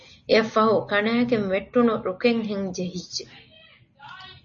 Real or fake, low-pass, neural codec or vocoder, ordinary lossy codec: real; 7.2 kHz; none; MP3, 32 kbps